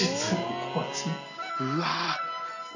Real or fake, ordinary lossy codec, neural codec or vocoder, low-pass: real; none; none; 7.2 kHz